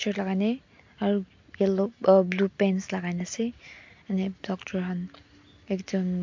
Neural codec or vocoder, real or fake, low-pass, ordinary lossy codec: none; real; 7.2 kHz; MP3, 48 kbps